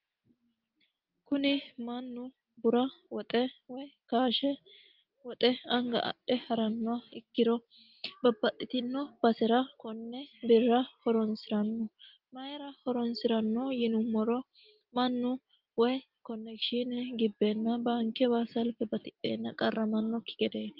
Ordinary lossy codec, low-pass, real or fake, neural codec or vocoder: Opus, 32 kbps; 5.4 kHz; real; none